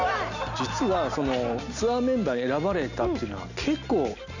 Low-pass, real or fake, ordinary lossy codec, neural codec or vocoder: 7.2 kHz; real; none; none